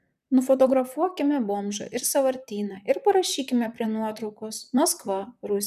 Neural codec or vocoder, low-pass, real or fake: vocoder, 44.1 kHz, 128 mel bands, Pupu-Vocoder; 14.4 kHz; fake